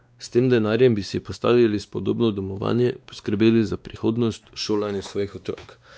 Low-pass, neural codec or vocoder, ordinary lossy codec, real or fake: none; codec, 16 kHz, 2 kbps, X-Codec, WavLM features, trained on Multilingual LibriSpeech; none; fake